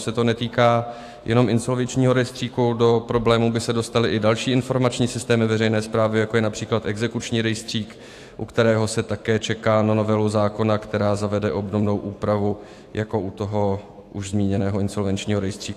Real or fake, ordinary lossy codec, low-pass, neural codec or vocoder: fake; AAC, 64 kbps; 14.4 kHz; autoencoder, 48 kHz, 128 numbers a frame, DAC-VAE, trained on Japanese speech